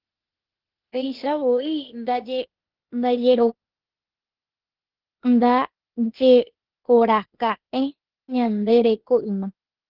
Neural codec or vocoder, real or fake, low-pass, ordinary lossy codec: codec, 16 kHz, 0.8 kbps, ZipCodec; fake; 5.4 kHz; Opus, 16 kbps